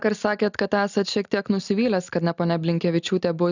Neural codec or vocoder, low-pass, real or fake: none; 7.2 kHz; real